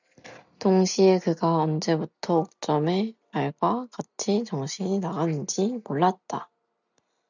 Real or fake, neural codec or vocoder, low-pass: real; none; 7.2 kHz